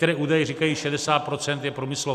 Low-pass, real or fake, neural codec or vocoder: 14.4 kHz; real; none